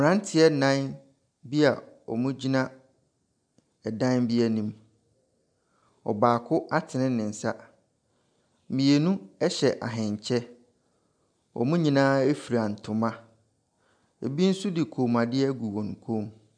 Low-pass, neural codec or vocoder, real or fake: 9.9 kHz; none; real